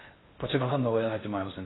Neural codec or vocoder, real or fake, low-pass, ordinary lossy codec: codec, 16 kHz in and 24 kHz out, 0.6 kbps, FocalCodec, streaming, 2048 codes; fake; 7.2 kHz; AAC, 16 kbps